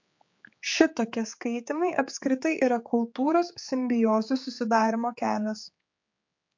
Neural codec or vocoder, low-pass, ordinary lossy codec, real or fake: codec, 16 kHz, 4 kbps, X-Codec, HuBERT features, trained on general audio; 7.2 kHz; MP3, 48 kbps; fake